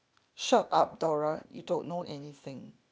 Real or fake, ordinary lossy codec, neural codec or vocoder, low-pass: fake; none; codec, 16 kHz, 0.8 kbps, ZipCodec; none